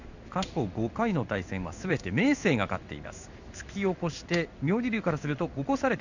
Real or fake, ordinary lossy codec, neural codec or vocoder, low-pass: fake; none; codec, 16 kHz in and 24 kHz out, 1 kbps, XY-Tokenizer; 7.2 kHz